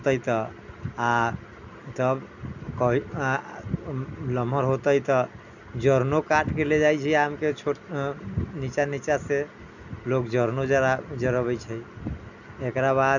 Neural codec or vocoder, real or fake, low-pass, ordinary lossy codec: none; real; 7.2 kHz; none